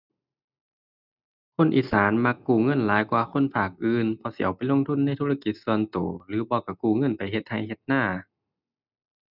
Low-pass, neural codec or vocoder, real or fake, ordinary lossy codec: 5.4 kHz; none; real; none